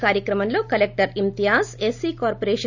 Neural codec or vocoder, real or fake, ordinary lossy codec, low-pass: none; real; none; 7.2 kHz